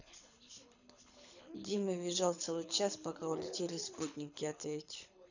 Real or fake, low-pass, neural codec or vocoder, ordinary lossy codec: fake; 7.2 kHz; codec, 24 kHz, 6 kbps, HILCodec; none